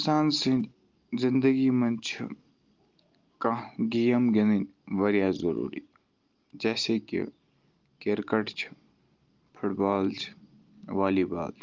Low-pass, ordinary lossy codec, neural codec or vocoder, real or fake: 7.2 kHz; Opus, 32 kbps; none; real